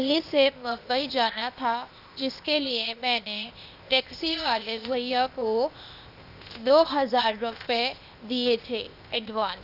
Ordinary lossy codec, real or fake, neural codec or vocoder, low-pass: none; fake; codec, 16 kHz, 0.8 kbps, ZipCodec; 5.4 kHz